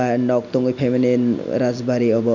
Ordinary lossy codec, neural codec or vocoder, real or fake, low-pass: none; none; real; 7.2 kHz